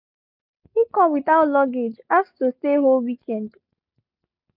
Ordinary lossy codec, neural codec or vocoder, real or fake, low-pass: none; none; real; 5.4 kHz